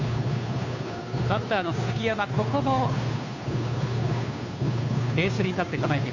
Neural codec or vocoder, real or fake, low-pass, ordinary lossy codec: codec, 16 kHz, 2 kbps, FunCodec, trained on Chinese and English, 25 frames a second; fake; 7.2 kHz; none